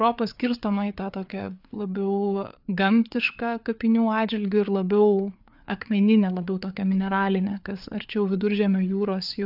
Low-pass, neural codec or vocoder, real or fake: 5.4 kHz; codec, 16 kHz, 4 kbps, FreqCodec, larger model; fake